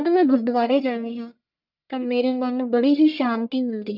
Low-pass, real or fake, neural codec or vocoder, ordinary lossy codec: 5.4 kHz; fake; codec, 44.1 kHz, 1.7 kbps, Pupu-Codec; none